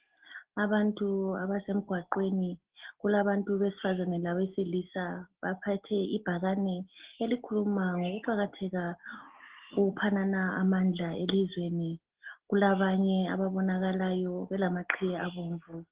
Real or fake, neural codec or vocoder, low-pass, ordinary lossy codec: real; none; 3.6 kHz; Opus, 16 kbps